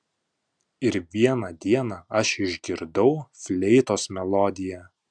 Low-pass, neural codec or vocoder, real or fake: 9.9 kHz; none; real